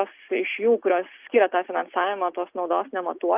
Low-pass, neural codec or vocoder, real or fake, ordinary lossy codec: 3.6 kHz; none; real; Opus, 24 kbps